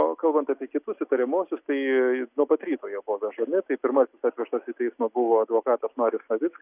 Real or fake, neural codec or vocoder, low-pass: real; none; 3.6 kHz